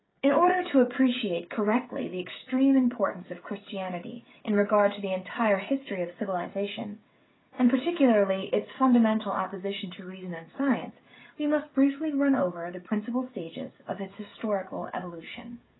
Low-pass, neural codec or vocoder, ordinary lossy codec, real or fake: 7.2 kHz; codec, 16 kHz, 16 kbps, FreqCodec, smaller model; AAC, 16 kbps; fake